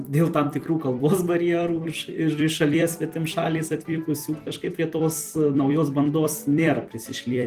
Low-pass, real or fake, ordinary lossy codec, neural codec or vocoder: 14.4 kHz; fake; Opus, 24 kbps; vocoder, 44.1 kHz, 128 mel bands every 256 samples, BigVGAN v2